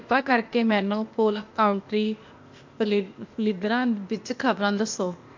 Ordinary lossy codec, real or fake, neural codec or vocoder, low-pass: MP3, 48 kbps; fake; codec, 16 kHz, 0.8 kbps, ZipCodec; 7.2 kHz